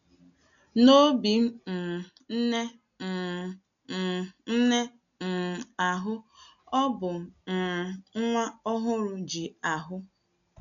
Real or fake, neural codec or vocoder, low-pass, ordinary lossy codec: real; none; 7.2 kHz; none